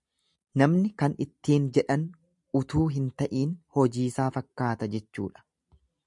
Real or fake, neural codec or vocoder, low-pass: real; none; 10.8 kHz